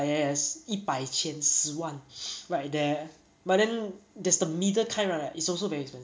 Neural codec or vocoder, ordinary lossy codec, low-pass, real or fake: none; none; none; real